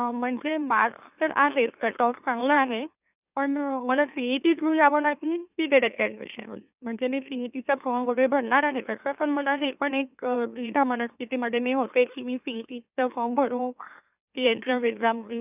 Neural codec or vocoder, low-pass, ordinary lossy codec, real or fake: autoencoder, 44.1 kHz, a latent of 192 numbers a frame, MeloTTS; 3.6 kHz; none; fake